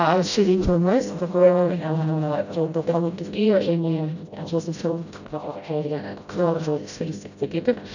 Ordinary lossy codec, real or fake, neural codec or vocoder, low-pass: none; fake; codec, 16 kHz, 0.5 kbps, FreqCodec, smaller model; 7.2 kHz